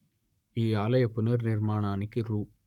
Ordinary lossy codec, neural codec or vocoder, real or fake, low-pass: none; codec, 44.1 kHz, 7.8 kbps, Pupu-Codec; fake; 19.8 kHz